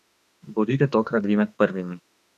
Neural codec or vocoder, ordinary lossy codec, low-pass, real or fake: autoencoder, 48 kHz, 32 numbers a frame, DAC-VAE, trained on Japanese speech; none; 14.4 kHz; fake